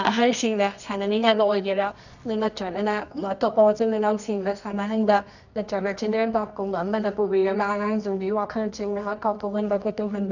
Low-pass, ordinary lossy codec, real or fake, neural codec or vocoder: 7.2 kHz; none; fake; codec, 24 kHz, 0.9 kbps, WavTokenizer, medium music audio release